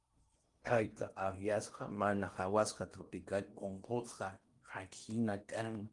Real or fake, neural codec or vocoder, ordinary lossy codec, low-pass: fake; codec, 16 kHz in and 24 kHz out, 0.8 kbps, FocalCodec, streaming, 65536 codes; Opus, 32 kbps; 10.8 kHz